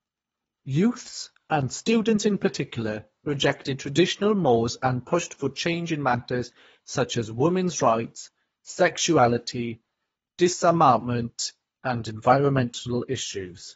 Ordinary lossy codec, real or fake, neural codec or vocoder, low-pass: AAC, 24 kbps; fake; codec, 24 kHz, 3 kbps, HILCodec; 10.8 kHz